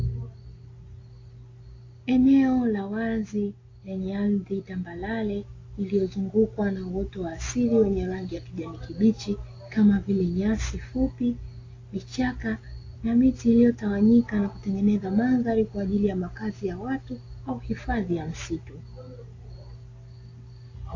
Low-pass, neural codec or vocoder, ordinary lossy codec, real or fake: 7.2 kHz; none; AAC, 32 kbps; real